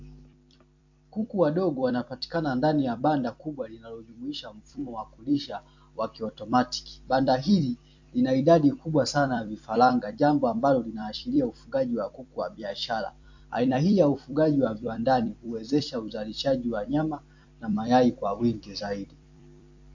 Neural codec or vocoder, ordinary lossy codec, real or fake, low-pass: vocoder, 44.1 kHz, 128 mel bands every 256 samples, BigVGAN v2; MP3, 48 kbps; fake; 7.2 kHz